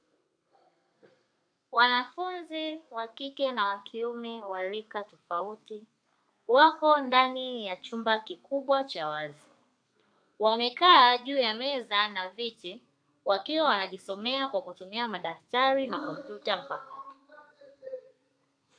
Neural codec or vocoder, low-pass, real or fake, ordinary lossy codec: codec, 32 kHz, 1.9 kbps, SNAC; 9.9 kHz; fake; AAC, 64 kbps